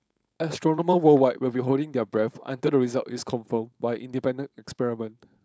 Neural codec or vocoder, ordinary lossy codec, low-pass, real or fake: codec, 16 kHz, 4.8 kbps, FACodec; none; none; fake